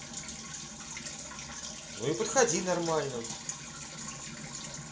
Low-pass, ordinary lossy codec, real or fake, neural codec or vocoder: none; none; real; none